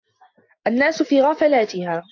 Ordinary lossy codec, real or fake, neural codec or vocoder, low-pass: AAC, 48 kbps; real; none; 7.2 kHz